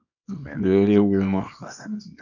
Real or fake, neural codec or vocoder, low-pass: fake; codec, 24 kHz, 0.9 kbps, WavTokenizer, small release; 7.2 kHz